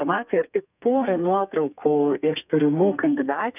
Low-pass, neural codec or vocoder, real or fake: 3.6 kHz; codec, 32 kHz, 1.9 kbps, SNAC; fake